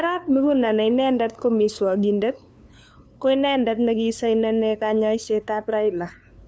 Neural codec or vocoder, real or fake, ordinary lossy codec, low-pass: codec, 16 kHz, 2 kbps, FunCodec, trained on LibriTTS, 25 frames a second; fake; none; none